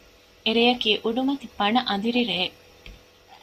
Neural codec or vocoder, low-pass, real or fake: none; 14.4 kHz; real